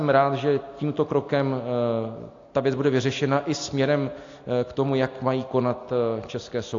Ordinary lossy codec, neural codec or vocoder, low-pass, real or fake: AAC, 48 kbps; none; 7.2 kHz; real